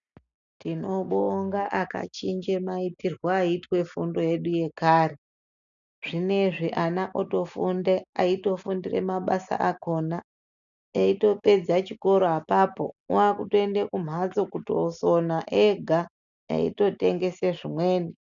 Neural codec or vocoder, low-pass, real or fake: none; 7.2 kHz; real